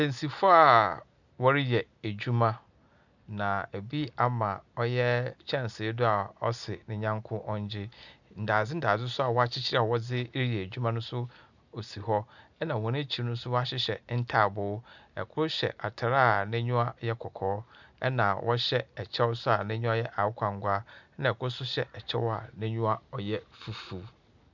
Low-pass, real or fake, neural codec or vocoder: 7.2 kHz; real; none